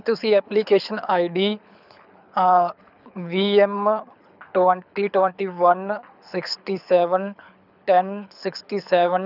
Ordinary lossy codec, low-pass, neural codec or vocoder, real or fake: none; 5.4 kHz; codec, 24 kHz, 6 kbps, HILCodec; fake